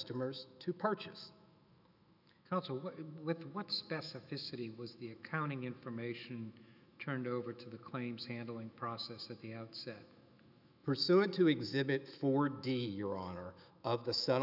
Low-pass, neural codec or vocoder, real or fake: 5.4 kHz; autoencoder, 48 kHz, 128 numbers a frame, DAC-VAE, trained on Japanese speech; fake